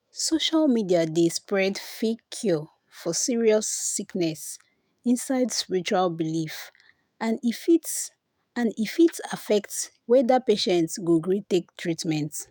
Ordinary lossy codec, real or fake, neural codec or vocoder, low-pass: none; fake; autoencoder, 48 kHz, 128 numbers a frame, DAC-VAE, trained on Japanese speech; none